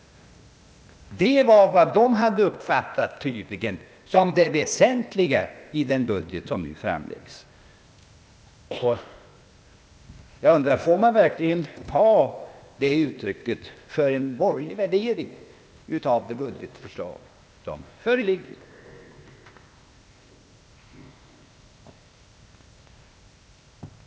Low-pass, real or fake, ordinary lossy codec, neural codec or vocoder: none; fake; none; codec, 16 kHz, 0.8 kbps, ZipCodec